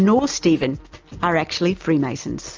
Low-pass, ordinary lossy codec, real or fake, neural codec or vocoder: 7.2 kHz; Opus, 24 kbps; real; none